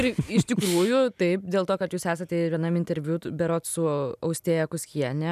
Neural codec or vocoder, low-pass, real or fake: none; 14.4 kHz; real